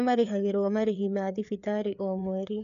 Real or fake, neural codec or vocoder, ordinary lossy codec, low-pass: fake; codec, 16 kHz, 4 kbps, FreqCodec, larger model; none; 7.2 kHz